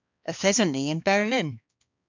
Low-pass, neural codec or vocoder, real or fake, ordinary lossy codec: 7.2 kHz; codec, 16 kHz, 2 kbps, X-Codec, HuBERT features, trained on balanced general audio; fake; MP3, 64 kbps